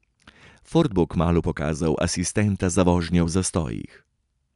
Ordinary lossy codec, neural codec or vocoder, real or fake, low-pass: none; none; real; 10.8 kHz